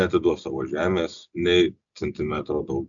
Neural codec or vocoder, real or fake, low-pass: none; real; 7.2 kHz